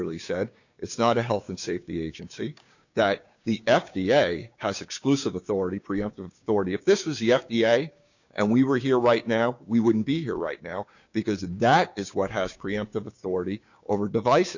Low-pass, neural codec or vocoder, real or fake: 7.2 kHz; codec, 16 kHz, 6 kbps, DAC; fake